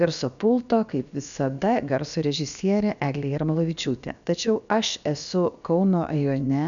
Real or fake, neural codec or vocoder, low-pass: fake; codec, 16 kHz, about 1 kbps, DyCAST, with the encoder's durations; 7.2 kHz